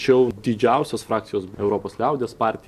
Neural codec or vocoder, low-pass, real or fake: none; 14.4 kHz; real